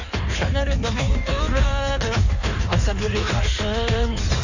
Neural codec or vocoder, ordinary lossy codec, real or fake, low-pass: codec, 16 kHz in and 24 kHz out, 1.1 kbps, FireRedTTS-2 codec; none; fake; 7.2 kHz